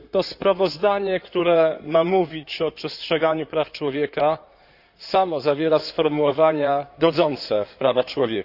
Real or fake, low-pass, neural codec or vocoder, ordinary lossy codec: fake; 5.4 kHz; codec, 16 kHz in and 24 kHz out, 2.2 kbps, FireRedTTS-2 codec; none